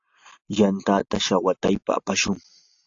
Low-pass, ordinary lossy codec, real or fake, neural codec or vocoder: 7.2 kHz; AAC, 48 kbps; real; none